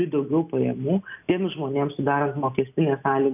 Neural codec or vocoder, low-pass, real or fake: none; 3.6 kHz; real